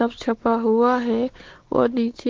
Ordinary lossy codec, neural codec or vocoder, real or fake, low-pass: Opus, 16 kbps; codec, 16 kHz, 4 kbps, X-Codec, WavLM features, trained on Multilingual LibriSpeech; fake; 7.2 kHz